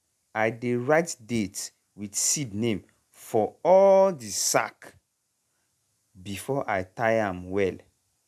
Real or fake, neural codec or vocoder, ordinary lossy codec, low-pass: real; none; none; 14.4 kHz